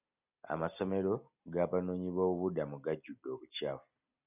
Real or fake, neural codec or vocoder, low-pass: real; none; 3.6 kHz